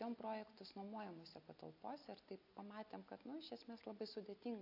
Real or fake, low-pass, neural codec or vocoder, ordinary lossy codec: real; 5.4 kHz; none; AAC, 48 kbps